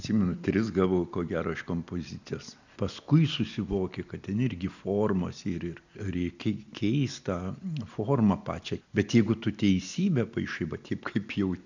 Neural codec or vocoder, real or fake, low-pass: none; real; 7.2 kHz